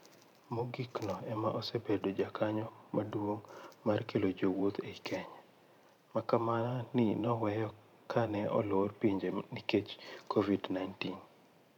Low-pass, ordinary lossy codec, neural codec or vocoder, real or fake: 19.8 kHz; none; vocoder, 44.1 kHz, 128 mel bands every 512 samples, BigVGAN v2; fake